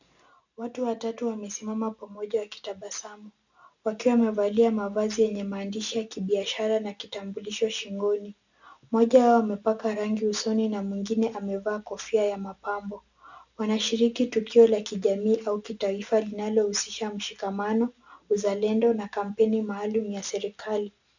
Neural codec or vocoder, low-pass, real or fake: none; 7.2 kHz; real